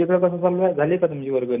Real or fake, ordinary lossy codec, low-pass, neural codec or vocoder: real; none; 3.6 kHz; none